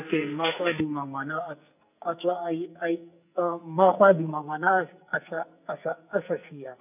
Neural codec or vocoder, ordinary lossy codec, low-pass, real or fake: codec, 44.1 kHz, 2.6 kbps, SNAC; none; 3.6 kHz; fake